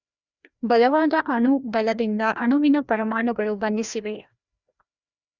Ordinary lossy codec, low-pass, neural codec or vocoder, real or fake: Opus, 64 kbps; 7.2 kHz; codec, 16 kHz, 1 kbps, FreqCodec, larger model; fake